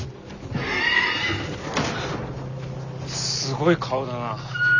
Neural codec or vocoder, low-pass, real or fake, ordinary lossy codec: none; 7.2 kHz; real; none